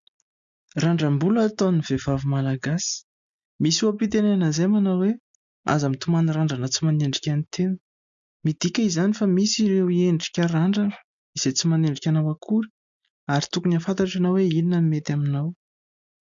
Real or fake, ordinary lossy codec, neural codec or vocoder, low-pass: real; MP3, 64 kbps; none; 7.2 kHz